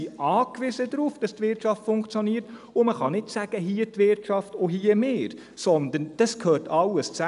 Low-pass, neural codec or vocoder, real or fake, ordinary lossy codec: 10.8 kHz; none; real; MP3, 96 kbps